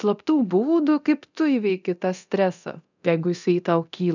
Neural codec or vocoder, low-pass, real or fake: codec, 24 kHz, 0.9 kbps, DualCodec; 7.2 kHz; fake